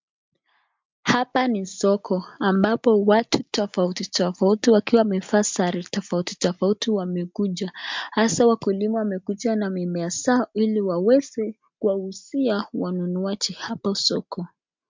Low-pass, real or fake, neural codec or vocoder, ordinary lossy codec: 7.2 kHz; real; none; MP3, 64 kbps